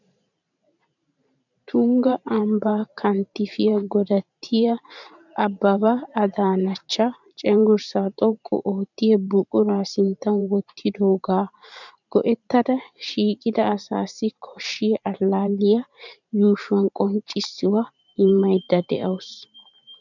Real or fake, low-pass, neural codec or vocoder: fake; 7.2 kHz; vocoder, 44.1 kHz, 128 mel bands every 512 samples, BigVGAN v2